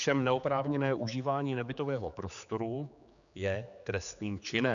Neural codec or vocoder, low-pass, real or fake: codec, 16 kHz, 4 kbps, X-Codec, HuBERT features, trained on general audio; 7.2 kHz; fake